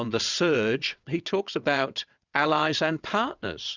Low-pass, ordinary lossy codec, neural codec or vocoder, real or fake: 7.2 kHz; Opus, 64 kbps; vocoder, 22.05 kHz, 80 mel bands, WaveNeXt; fake